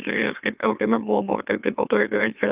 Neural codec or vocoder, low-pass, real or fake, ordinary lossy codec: autoencoder, 44.1 kHz, a latent of 192 numbers a frame, MeloTTS; 3.6 kHz; fake; Opus, 24 kbps